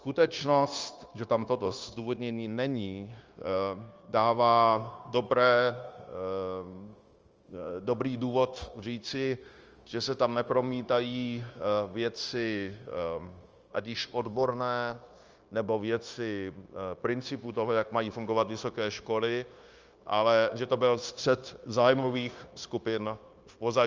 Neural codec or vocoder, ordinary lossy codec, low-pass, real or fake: codec, 16 kHz, 0.9 kbps, LongCat-Audio-Codec; Opus, 24 kbps; 7.2 kHz; fake